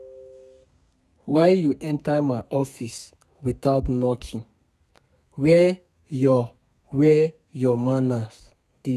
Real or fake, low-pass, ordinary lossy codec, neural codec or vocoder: fake; 14.4 kHz; none; codec, 32 kHz, 1.9 kbps, SNAC